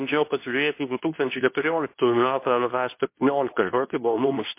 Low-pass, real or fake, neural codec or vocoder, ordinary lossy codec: 3.6 kHz; fake; codec, 24 kHz, 0.9 kbps, WavTokenizer, medium speech release version 2; MP3, 24 kbps